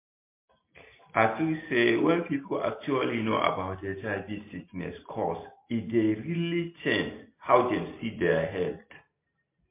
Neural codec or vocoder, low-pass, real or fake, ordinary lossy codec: none; 3.6 kHz; real; MP3, 24 kbps